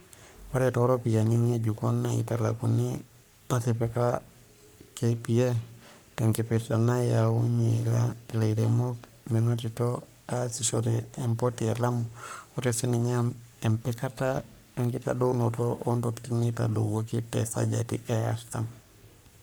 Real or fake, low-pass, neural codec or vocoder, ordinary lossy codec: fake; none; codec, 44.1 kHz, 3.4 kbps, Pupu-Codec; none